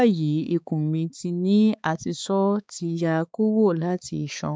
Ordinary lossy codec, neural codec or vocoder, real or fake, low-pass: none; codec, 16 kHz, 4 kbps, X-Codec, HuBERT features, trained on balanced general audio; fake; none